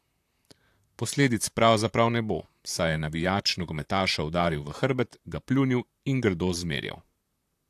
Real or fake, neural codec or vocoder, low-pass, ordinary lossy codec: fake; vocoder, 44.1 kHz, 128 mel bands, Pupu-Vocoder; 14.4 kHz; AAC, 64 kbps